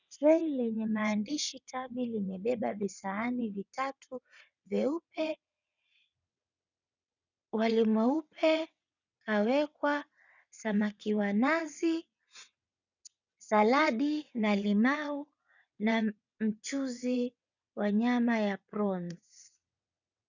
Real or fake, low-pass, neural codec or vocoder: fake; 7.2 kHz; vocoder, 22.05 kHz, 80 mel bands, WaveNeXt